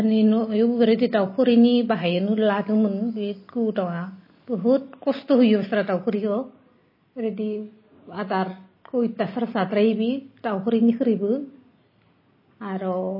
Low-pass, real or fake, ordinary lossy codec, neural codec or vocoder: 5.4 kHz; real; MP3, 24 kbps; none